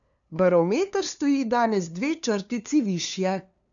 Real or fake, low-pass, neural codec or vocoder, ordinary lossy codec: fake; 7.2 kHz; codec, 16 kHz, 2 kbps, FunCodec, trained on LibriTTS, 25 frames a second; none